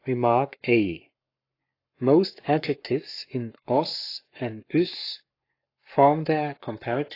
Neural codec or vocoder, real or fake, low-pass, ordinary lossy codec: codec, 44.1 kHz, 7.8 kbps, Pupu-Codec; fake; 5.4 kHz; AAC, 32 kbps